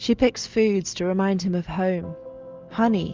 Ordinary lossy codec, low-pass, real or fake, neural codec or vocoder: Opus, 24 kbps; 7.2 kHz; real; none